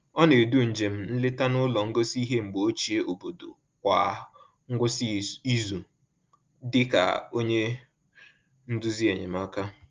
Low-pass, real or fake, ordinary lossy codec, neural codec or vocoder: 7.2 kHz; real; Opus, 32 kbps; none